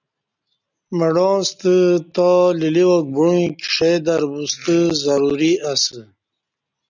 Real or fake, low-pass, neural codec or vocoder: real; 7.2 kHz; none